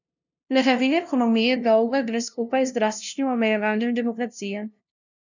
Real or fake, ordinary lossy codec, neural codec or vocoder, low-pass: fake; none; codec, 16 kHz, 0.5 kbps, FunCodec, trained on LibriTTS, 25 frames a second; 7.2 kHz